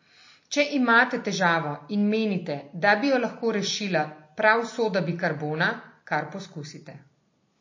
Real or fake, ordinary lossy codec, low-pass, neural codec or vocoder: real; MP3, 32 kbps; 7.2 kHz; none